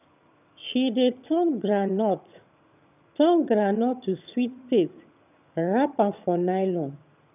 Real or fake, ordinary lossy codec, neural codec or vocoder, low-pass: fake; none; vocoder, 22.05 kHz, 80 mel bands, HiFi-GAN; 3.6 kHz